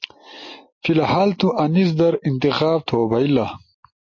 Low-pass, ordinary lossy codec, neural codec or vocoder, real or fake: 7.2 kHz; MP3, 32 kbps; none; real